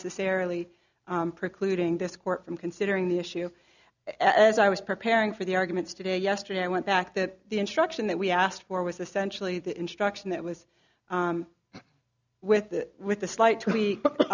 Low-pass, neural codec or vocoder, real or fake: 7.2 kHz; none; real